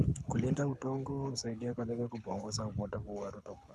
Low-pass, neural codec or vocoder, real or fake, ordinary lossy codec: none; codec, 24 kHz, 6 kbps, HILCodec; fake; none